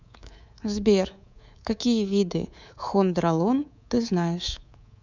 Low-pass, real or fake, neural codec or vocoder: 7.2 kHz; fake; codec, 24 kHz, 3.1 kbps, DualCodec